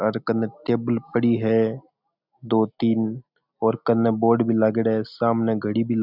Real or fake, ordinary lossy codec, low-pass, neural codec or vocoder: real; none; 5.4 kHz; none